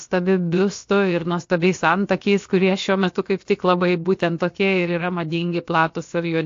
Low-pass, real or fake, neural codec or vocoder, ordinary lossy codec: 7.2 kHz; fake; codec, 16 kHz, about 1 kbps, DyCAST, with the encoder's durations; AAC, 48 kbps